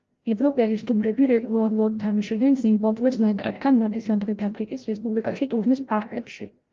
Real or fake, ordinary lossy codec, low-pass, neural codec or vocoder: fake; Opus, 32 kbps; 7.2 kHz; codec, 16 kHz, 0.5 kbps, FreqCodec, larger model